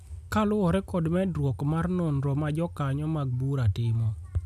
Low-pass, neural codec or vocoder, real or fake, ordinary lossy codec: 14.4 kHz; none; real; none